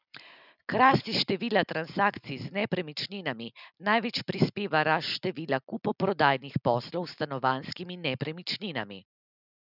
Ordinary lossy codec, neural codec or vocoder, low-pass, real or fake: none; none; 5.4 kHz; real